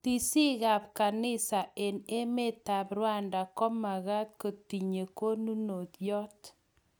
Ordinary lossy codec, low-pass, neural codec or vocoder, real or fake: none; none; none; real